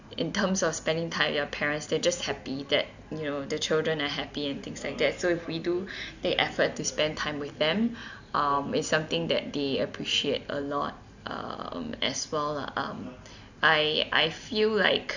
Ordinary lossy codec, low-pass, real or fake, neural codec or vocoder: none; 7.2 kHz; real; none